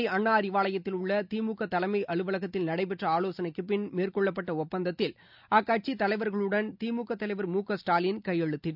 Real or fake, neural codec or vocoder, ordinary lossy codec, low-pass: real; none; none; 5.4 kHz